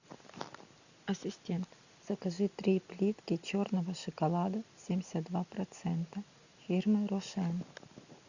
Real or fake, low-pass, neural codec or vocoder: real; 7.2 kHz; none